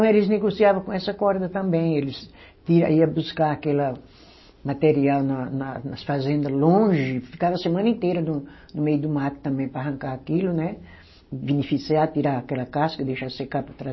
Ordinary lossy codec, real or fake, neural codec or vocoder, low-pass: MP3, 24 kbps; real; none; 7.2 kHz